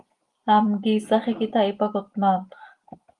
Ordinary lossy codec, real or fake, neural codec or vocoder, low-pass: Opus, 24 kbps; real; none; 10.8 kHz